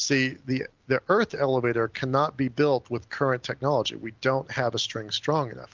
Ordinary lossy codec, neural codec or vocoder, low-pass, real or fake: Opus, 24 kbps; none; 7.2 kHz; real